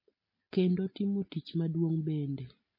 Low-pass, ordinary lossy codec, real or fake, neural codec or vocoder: 5.4 kHz; MP3, 24 kbps; real; none